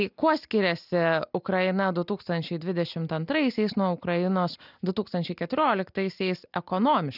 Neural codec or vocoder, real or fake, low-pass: none; real; 5.4 kHz